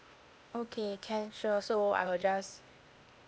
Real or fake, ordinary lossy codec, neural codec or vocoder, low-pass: fake; none; codec, 16 kHz, 0.8 kbps, ZipCodec; none